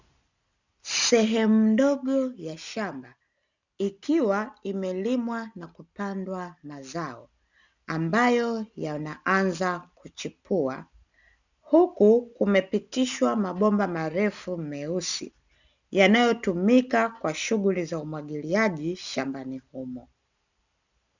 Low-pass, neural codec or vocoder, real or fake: 7.2 kHz; none; real